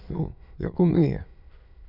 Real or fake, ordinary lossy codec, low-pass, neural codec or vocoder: fake; none; 5.4 kHz; autoencoder, 22.05 kHz, a latent of 192 numbers a frame, VITS, trained on many speakers